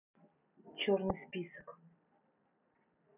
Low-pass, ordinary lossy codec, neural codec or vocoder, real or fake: 3.6 kHz; none; none; real